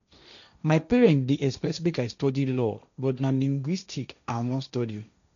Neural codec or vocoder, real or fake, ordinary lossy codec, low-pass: codec, 16 kHz, 1.1 kbps, Voila-Tokenizer; fake; none; 7.2 kHz